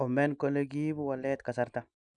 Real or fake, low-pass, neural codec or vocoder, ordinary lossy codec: real; 9.9 kHz; none; none